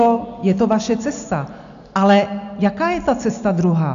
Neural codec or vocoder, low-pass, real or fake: none; 7.2 kHz; real